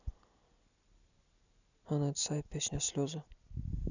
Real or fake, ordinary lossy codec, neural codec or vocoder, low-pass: real; none; none; 7.2 kHz